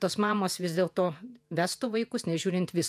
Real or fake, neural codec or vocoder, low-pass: fake; vocoder, 48 kHz, 128 mel bands, Vocos; 14.4 kHz